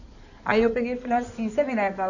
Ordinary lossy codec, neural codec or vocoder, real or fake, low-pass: none; codec, 16 kHz in and 24 kHz out, 2.2 kbps, FireRedTTS-2 codec; fake; 7.2 kHz